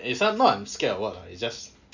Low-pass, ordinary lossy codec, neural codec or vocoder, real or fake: 7.2 kHz; none; none; real